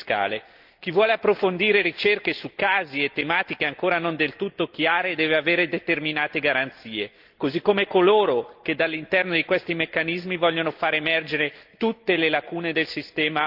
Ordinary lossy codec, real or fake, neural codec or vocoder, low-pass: Opus, 32 kbps; real; none; 5.4 kHz